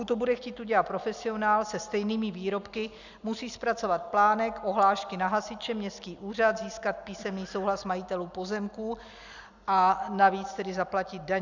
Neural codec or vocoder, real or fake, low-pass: none; real; 7.2 kHz